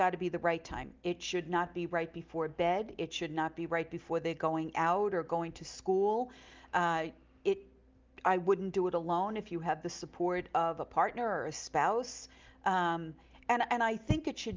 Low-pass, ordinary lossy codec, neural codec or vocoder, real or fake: 7.2 kHz; Opus, 24 kbps; none; real